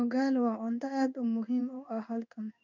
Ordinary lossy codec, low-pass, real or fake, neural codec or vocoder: none; 7.2 kHz; fake; codec, 16 kHz in and 24 kHz out, 1 kbps, XY-Tokenizer